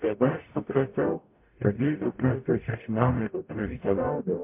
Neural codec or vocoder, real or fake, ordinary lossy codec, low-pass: codec, 44.1 kHz, 0.9 kbps, DAC; fake; MP3, 32 kbps; 3.6 kHz